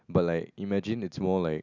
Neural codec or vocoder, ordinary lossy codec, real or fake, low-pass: none; none; real; 7.2 kHz